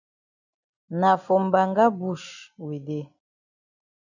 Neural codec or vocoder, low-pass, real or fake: none; 7.2 kHz; real